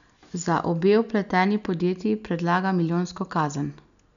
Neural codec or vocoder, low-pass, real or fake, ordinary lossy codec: none; 7.2 kHz; real; none